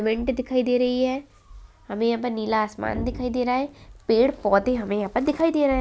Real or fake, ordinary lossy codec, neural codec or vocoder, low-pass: real; none; none; none